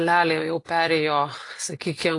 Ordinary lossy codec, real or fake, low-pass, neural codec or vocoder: AAC, 48 kbps; real; 10.8 kHz; none